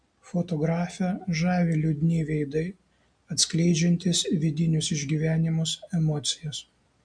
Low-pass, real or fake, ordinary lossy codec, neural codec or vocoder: 9.9 kHz; real; MP3, 64 kbps; none